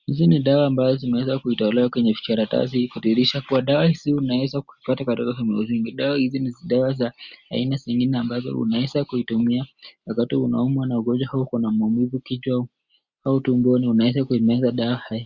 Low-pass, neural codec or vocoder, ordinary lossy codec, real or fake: 7.2 kHz; none; Opus, 64 kbps; real